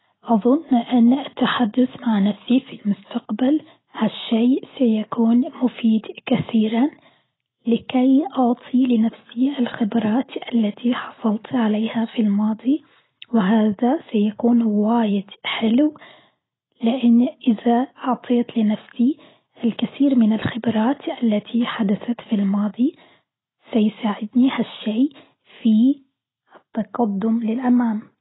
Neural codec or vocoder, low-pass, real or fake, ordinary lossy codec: none; 7.2 kHz; real; AAC, 16 kbps